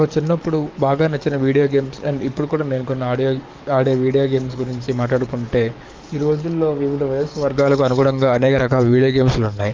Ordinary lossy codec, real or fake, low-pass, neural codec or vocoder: Opus, 32 kbps; fake; 7.2 kHz; codec, 44.1 kHz, 7.8 kbps, DAC